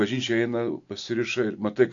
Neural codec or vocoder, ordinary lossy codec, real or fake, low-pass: none; AAC, 48 kbps; real; 7.2 kHz